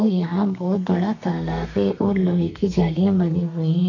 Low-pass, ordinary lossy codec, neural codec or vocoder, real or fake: 7.2 kHz; none; codec, 32 kHz, 1.9 kbps, SNAC; fake